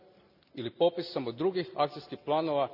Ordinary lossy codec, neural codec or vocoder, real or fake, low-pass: none; none; real; 5.4 kHz